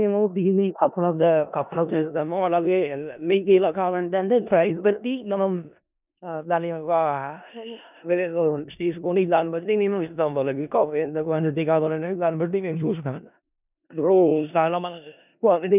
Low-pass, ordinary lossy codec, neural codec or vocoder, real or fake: 3.6 kHz; none; codec, 16 kHz in and 24 kHz out, 0.4 kbps, LongCat-Audio-Codec, four codebook decoder; fake